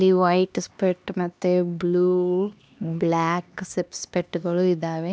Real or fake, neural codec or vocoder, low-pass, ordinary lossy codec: fake; codec, 16 kHz, 2 kbps, X-Codec, HuBERT features, trained on LibriSpeech; none; none